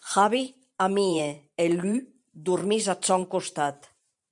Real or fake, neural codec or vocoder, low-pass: fake; vocoder, 44.1 kHz, 128 mel bands every 512 samples, BigVGAN v2; 10.8 kHz